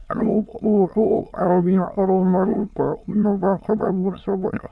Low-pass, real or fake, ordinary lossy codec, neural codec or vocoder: none; fake; none; autoencoder, 22.05 kHz, a latent of 192 numbers a frame, VITS, trained on many speakers